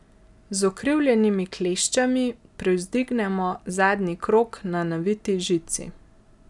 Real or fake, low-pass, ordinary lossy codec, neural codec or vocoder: real; 10.8 kHz; none; none